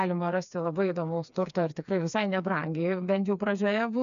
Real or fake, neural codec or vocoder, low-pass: fake; codec, 16 kHz, 4 kbps, FreqCodec, smaller model; 7.2 kHz